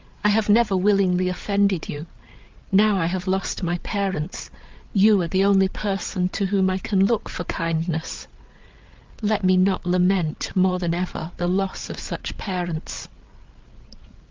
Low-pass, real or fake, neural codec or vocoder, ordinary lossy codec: 7.2 kHz; fake; codec, 16 kHz, 8 kbps, FreqCodec, larger model; Opus, 32 kbps